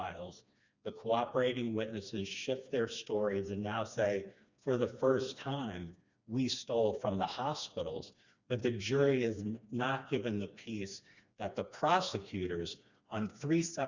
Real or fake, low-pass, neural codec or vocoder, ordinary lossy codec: fake; 7.2 kHz; codec, 16 kHz, 2 kbps, FreqCodec, smaller model; Opus, 64 kbps